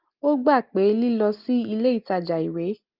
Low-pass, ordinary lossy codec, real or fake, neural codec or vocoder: 5.4 kHz; Opus, 24 kbps; real; none